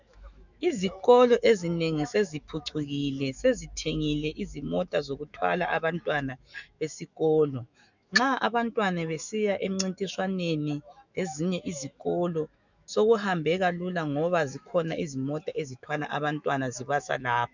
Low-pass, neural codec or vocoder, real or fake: 7.2 kHz; autoencoder, 48 kHz, 128 numbers a frame, DAC-VAE, trained on Japanese speech; fake